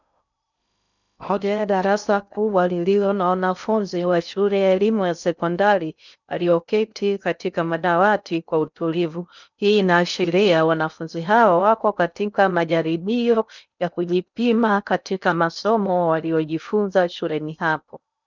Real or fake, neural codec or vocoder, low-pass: fake; codec, 16 kHz in and 24 kHz out, 0.6 kbps, FocalCodec, streaming, 2048 codes; 7.2 kHz